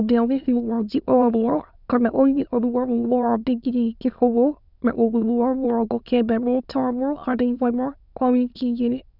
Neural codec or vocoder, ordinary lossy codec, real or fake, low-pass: autoencoder, 22.05 kHz, a latent of 192 numbers a frame, VITS, trained on many speakers; none; fake; 5.4 kHz